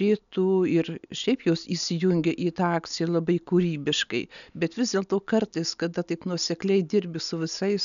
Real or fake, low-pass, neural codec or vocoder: real; 7.2 kHz; none